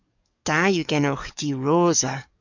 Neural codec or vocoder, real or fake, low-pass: codec, 44.1 kHz, 7.8 kbps, DAC; fake; 7.2 kHz